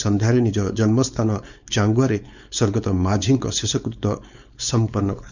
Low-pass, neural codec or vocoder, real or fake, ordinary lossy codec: 7.2 kHz; codec, 16 kHz, 4.8 kbps, FACodec; fake; none